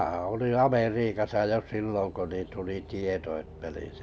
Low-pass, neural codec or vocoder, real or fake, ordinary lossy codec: none; none; real; none